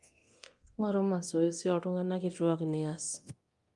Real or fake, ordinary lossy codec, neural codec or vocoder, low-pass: fake; Opus, 32 kbps; codec, 24 kHz, 0.9 kbps, DualCodec; 10.8 kHz